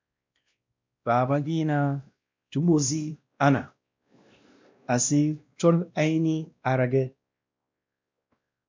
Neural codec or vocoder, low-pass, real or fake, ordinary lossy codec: codec, 16 kHz, 1 kbps, X-Codec, WavLM features, trained on Multilingual LibriSpeech; 7.2 kHz; fake; MP3, 64 kbps